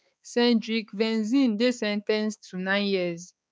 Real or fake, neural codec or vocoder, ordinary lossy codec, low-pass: fake; codec, 16 kHz, 4 kbps, X-Codec, HuBERT features, trained on balanced general audio; none; none